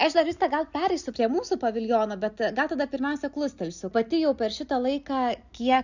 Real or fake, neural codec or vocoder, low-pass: real; none; 7.2 kHz